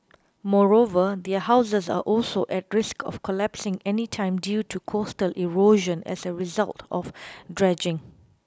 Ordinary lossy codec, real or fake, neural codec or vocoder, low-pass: none; real; none; none